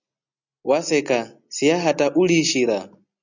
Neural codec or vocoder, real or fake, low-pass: none; real; 7.2 kHz